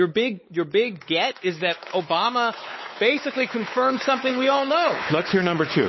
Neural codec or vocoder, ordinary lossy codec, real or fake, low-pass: codec, 16 kHz, 4 kbps, X-Codec, WavLM features, trained on Multilingual LibriSpeech; MP3, 24 kbps; fake; 7.2 kHz